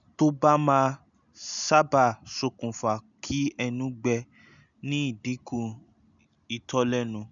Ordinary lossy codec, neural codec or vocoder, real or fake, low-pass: none; none; real; 7.2 kHz